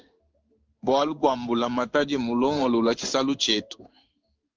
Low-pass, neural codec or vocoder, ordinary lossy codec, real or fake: 7.2 kHz; codec, 16 kHz in and 24 kHz out, 1 kbps, XY-Tokenizer; Opus, 24 kbps; fake